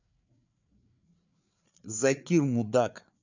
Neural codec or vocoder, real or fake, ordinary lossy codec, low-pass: codec, 16 kHz, 4 kbps, FreqCodec, larger model; fake; none; 7.2 kHz